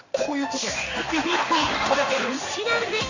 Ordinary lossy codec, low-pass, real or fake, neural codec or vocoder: none; 7.2 kHz; fake; codec, 16 kHz in and 24 kHz out, 1 kbps, XY-Tokenizer